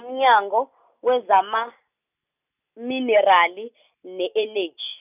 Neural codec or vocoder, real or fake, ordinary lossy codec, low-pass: none; real; none; 3.6 kHz